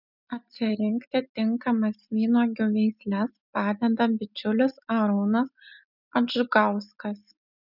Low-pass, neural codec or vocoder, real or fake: 5.4 kHz; none; real